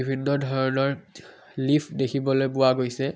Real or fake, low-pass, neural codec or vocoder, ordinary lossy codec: real; none; none; none